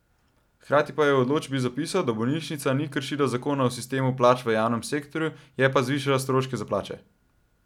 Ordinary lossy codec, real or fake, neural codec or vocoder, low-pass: none; real; none; 19.8 kHz